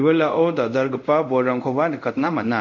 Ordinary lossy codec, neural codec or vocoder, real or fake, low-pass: none; codec, 24 kHz, 0.5 kbps, DualCodec; fake; 7.2 kHz